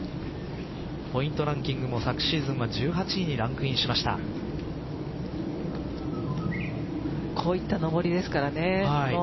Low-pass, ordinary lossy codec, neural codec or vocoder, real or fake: 7.2 kHz; MP3, 24 kbps; none; real